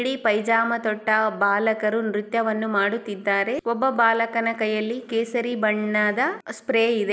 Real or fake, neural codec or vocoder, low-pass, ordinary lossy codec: real; none; none; none